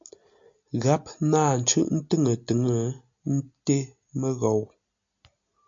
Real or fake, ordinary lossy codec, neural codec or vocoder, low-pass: real; MP3, 64 kbps; none; 7.2 kHz